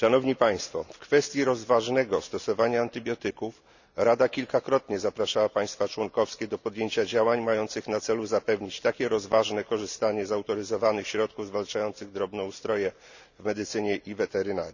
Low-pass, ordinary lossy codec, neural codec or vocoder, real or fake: 7.2 kHz; none; none; real